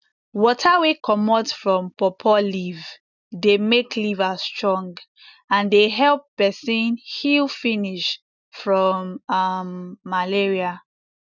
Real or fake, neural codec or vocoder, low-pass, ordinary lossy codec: real; none; 7.2 kHz; none